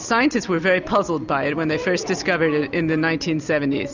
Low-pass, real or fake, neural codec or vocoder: 7.2 kHz; real; none